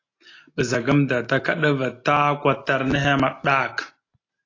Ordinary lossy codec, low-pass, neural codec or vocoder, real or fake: AAC, 32 kbps; 7.2 kHz; none; real